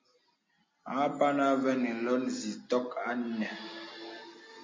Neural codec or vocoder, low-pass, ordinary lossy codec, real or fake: none; 7.2 kHz; MP3, 32 kbps; real